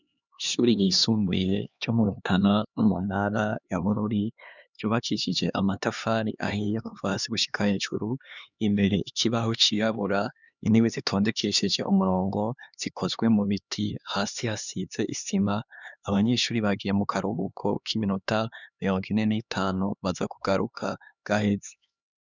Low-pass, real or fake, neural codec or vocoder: 7.2 kHz; fake; codec, 16 kHz, 2 kbps, X-Codec, HuBERT features, trained on LibriSpeech